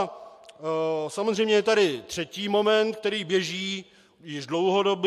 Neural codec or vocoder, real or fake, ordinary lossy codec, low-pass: none; real; MP3, 64 kbps; 14.4 kHz